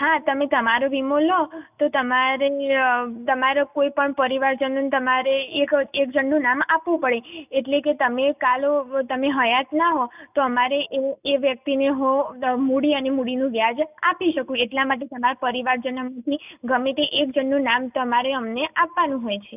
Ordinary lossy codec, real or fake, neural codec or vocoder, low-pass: none; real; none; 3.6 kHz